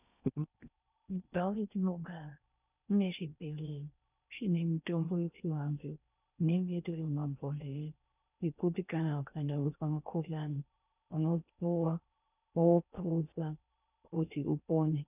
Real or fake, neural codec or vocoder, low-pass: fake; codec, 16 kHz in and 24 kHz out, 0.6 kbps, FocalCodec, streaming, 4096 codes; 3.6 kHz